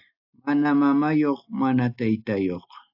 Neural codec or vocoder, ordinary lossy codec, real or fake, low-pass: none; AAC, 48 kbps; real; 5.4 kHz